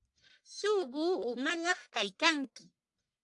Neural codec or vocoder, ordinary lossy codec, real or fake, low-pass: codec, 44.1 kHz, 1.7 kbps, Pupu-Codec; AAC, 64 kbps; fake; 10.8 kHz